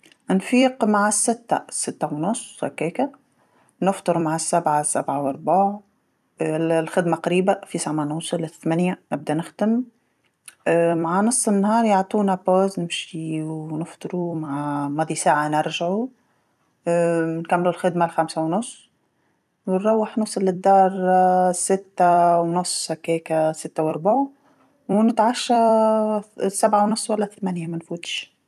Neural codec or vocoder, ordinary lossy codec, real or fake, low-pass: vocoder, 44.1 kHz, 128 mel bands every 256 samples, BigVGAN v2; none; fake; 14.4 kHz